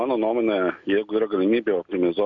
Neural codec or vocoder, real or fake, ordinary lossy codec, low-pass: none; real; MP3, 96 kbps; 7.2 kHz